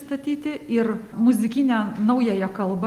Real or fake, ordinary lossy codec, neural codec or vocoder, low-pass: real; Opus, 24 kbps; none; 14.4 kHz